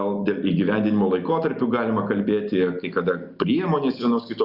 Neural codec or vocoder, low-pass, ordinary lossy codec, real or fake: none; 5.4 kHz; Opus, 64 kbps; real